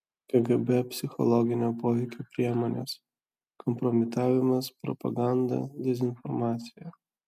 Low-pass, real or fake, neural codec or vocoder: 14.4 kHz; real; none